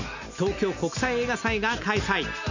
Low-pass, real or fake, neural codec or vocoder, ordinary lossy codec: 7.2 kHz; real; none; none